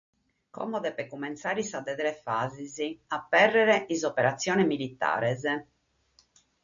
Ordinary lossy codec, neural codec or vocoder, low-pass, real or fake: MP3, 64 kbps; none; 7.2 kHz; real